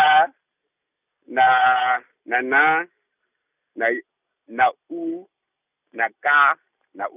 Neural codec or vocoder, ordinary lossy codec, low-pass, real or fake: none; none; 3.6 kHz; real